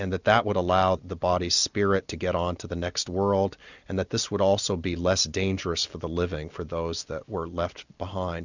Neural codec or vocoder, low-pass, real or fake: none; 7.2 kHz; real